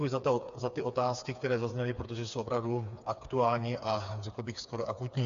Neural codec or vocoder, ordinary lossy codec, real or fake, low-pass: codec, 16 kHz, 4 kbps, FreqCodec, smaller model; AAC, 64 kbps; fake; 7.2 kHz